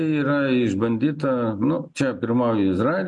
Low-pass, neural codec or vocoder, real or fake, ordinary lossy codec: 10.8 kHz; none; real; MP3, 96 kbps